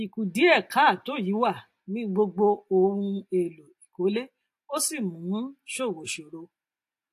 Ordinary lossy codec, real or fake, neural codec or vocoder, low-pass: AAC, 64 kbps; real; none; 14.4 kHz